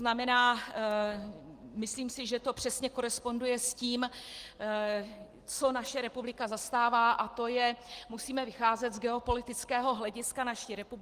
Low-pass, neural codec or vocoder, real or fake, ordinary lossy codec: 14.4 kHz; none; real; Opus, 24 kbps